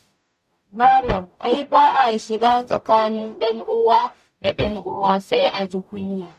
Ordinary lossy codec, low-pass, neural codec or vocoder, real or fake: none; 14.4 kHz; codec, 44.1 kHz, 0.9 kbps, DAC; fake